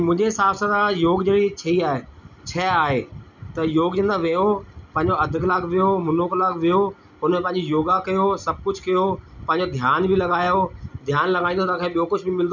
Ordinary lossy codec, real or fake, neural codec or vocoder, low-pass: none; real; none; 7.2 kHz